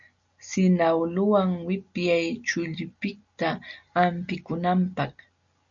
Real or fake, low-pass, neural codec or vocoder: real; 7.2 kHz; none